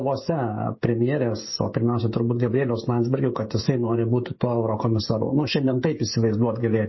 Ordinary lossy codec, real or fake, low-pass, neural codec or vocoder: MP3, 24 kbps; fake; 7.2 kHz; codec, 16 kHz, 16 kbps, FreqCodec, smaller model